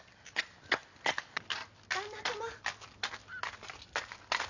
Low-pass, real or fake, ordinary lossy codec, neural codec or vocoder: 7.2 kHz; real; none; none